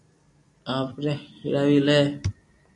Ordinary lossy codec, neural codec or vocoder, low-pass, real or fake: AAC, 64 kbps; none; 10.8 kHz; real